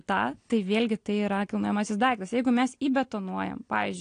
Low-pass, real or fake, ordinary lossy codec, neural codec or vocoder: 9.9 kHz; real; AAC, 48 kbps; none